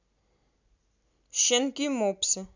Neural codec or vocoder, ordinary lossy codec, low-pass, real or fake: none; none; 7.2 kHz; real